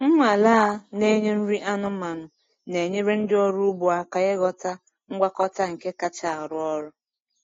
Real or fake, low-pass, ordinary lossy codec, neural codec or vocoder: real; 7.2 kHz; AAC, 32 kbps; none